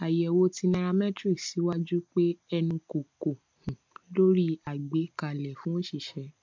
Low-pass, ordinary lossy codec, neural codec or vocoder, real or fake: 7.2 kHz; MP3, 48 kbps; none; real